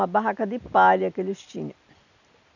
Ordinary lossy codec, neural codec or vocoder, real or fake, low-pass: none; none; real; 7.2 kHz